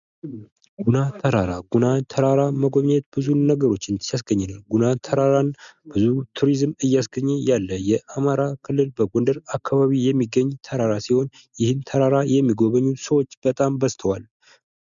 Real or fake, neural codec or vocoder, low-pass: real; none; 7.2 kHz